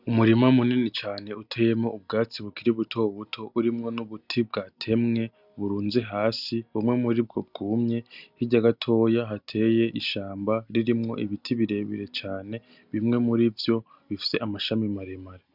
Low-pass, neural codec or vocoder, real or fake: 5.4 kHz; none; real